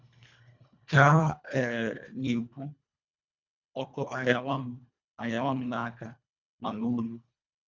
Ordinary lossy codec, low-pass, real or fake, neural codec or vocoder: none; 7.2 kHz; fake; codec, 24 kHz, 1.5 kbps, HILCodec